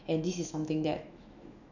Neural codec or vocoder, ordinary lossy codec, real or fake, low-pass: autoencoder, 48 kHz, 128 numbers a frame, DAC-VAE, trained on Japanese speech; none; fake; 7.2 kHz